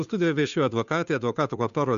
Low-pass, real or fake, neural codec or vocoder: 7.2 kHz; fake; codec, 16 kHz, 2 kbps, FunCodec, trained on Chinese and English, 25 frames a second